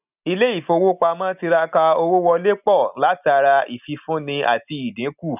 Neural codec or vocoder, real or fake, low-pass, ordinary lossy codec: none; real; 3.6 kHz; none